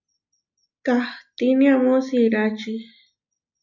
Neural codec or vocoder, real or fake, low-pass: none; real; 7.2 kHz